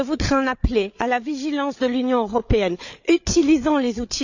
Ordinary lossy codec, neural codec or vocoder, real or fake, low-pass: none; codec, 16 kHz, 8 kbps, FreqCodec, larger model; fake; 7.2 kHz